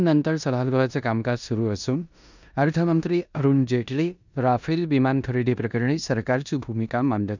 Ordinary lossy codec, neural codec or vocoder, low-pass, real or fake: none; codec, 16 kHz in and 24 kHz out, 0.9 kbps, LongCat-Audio-Codec, four codebook decoder; 7.2 kHz; fake